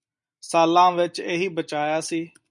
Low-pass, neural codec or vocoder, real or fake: 10.8 kHz; none; real